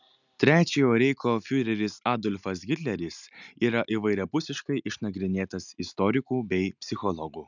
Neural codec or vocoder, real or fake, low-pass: none; real; 7.2 kHz